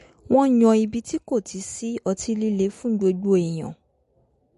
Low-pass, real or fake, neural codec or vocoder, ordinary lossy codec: 14.4 kHz; real; none; MP3, 48 kbps